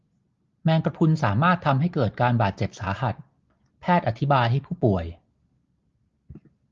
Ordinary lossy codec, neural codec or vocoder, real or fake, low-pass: Opus, 32 kbps; none; real; 7.2 kHz